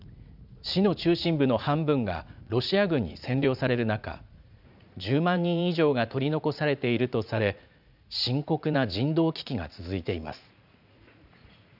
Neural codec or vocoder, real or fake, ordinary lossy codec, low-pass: vocoder, 44.1 kHz, 80 mel bands, Vocos; fake; none; 5.4 kHz